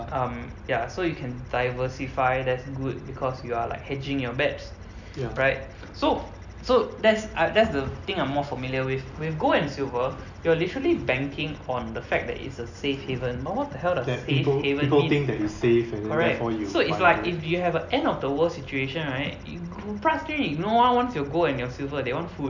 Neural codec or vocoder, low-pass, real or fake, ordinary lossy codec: none; 7.2 kHz; real; none